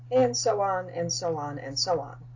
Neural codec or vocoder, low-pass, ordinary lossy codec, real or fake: none; 7.2 kHz; AAC, 48 kbps; real